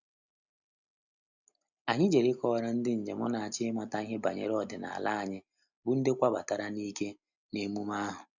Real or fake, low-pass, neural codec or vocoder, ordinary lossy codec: real; 7.2 kHz; none; none